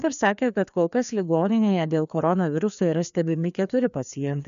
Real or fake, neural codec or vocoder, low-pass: fake; codec, 16 kHz, 2 kbps, FreqCodec, larger model; 7.2 kHz